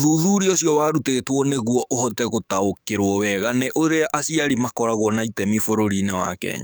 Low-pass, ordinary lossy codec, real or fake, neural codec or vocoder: none; none; fake; codec, 44.1 kHz, 7.8 kbps, DAC